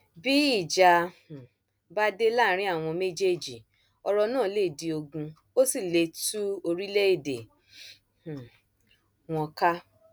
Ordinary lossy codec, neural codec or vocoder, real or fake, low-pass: none; none; real; none